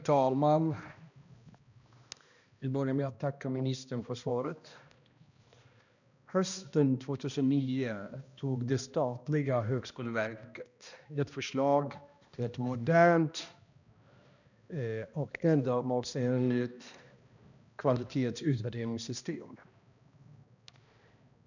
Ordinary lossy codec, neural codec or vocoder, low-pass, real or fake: none; codec, 16 kHz, 1 kbps, X-Codec, HuBERT features, trained on balanced general audio; 7.2 kHz; fake